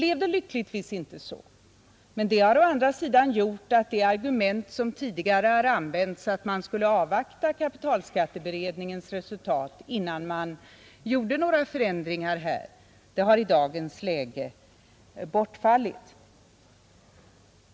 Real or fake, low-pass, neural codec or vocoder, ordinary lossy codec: real; none; none; none